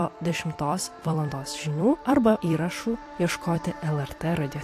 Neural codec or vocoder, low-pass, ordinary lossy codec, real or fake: vocoder, 44.1 kHz, 128 mel bands every 256 samples, BigVGAN v2; 14.4 kHz; AAC, 64 kbps; fake